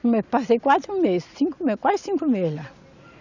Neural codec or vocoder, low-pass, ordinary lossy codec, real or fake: none; 7.2 kHz; none; real